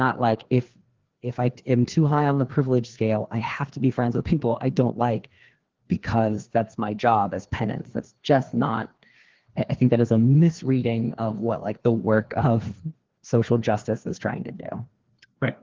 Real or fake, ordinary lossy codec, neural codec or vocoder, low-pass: fake; Opus, 16 kbps; codec, 16 kHz, 2 kbps, FreqCodec, larger model; 7.2 kHz